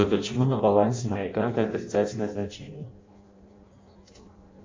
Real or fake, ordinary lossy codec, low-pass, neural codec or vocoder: fake; MP3, 48 kbps; 7.2 kHz; codec, 16 kHz in and 24 kHz out, 0.6 kbps, FireRedTTS-2 codec